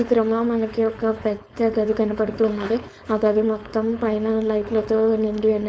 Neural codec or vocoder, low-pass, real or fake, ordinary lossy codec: codec, 16 kHz, 4.8 kbps, FACodec; none; fake; none